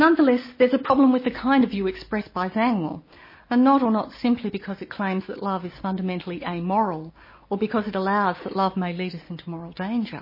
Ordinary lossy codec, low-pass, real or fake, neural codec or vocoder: MP3, 24 kbps; 5.4 kHz; real; none